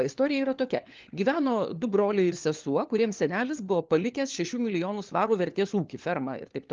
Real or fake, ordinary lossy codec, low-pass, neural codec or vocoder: fake; Opus, 32 kbps; 7.2 kHz; codec, 16 kHz, 4 kbps, FunCodec, trained on LibriTTS, 50 frames a second